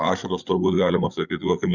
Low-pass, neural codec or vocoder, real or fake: 7.2 kHz; codec, 16 kHz, 8 kbps, FreqCodec, larger model; fake